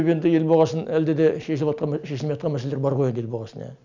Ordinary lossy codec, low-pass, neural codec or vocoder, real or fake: none; 7.2 kHz; none; real